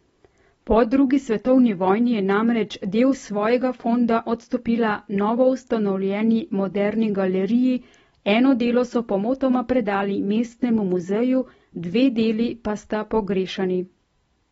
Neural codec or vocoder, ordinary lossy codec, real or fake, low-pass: none; AAC, 24 kbps; real; 19.8 kHz